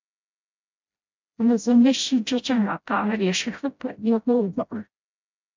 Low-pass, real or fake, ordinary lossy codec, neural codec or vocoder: 7.2 kHz; fake; MP3, 64 kbps; codec, 16 kHz, 0.5 kbps, FreqCodec, smaller model